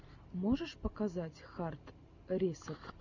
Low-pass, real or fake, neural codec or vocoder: 7.2 kHz; real; none